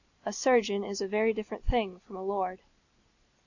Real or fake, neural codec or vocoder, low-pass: real; none; 7.2 kHz